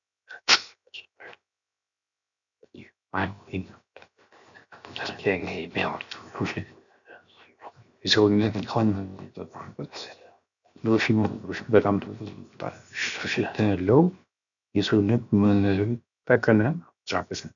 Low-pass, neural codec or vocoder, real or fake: 7.2 kHz; codec, 16 kHz, 0.7 kbps, FocalCodec; fake